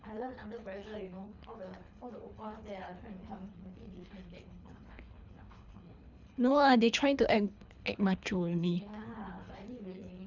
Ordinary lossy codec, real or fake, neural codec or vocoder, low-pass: none; fake; codec, 24 kHz, 3 kbps, HILCodec; 7.2 kHz